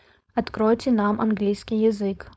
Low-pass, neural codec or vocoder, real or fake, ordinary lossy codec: none; codec, 16 kHz, 4.8 kbps, FACodec; fake; none